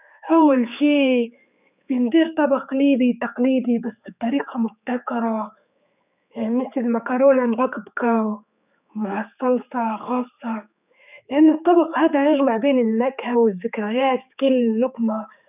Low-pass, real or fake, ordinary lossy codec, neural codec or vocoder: 3.6 kHz; fake; none; codec, 16 kHz, 4 kbps, X-Codec, HuBERT features, trained on general audio